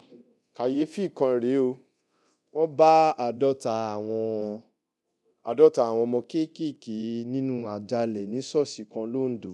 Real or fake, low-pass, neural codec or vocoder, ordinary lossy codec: fake; none; codec, 24 kHz, 0.9 kbps, DualCodec; none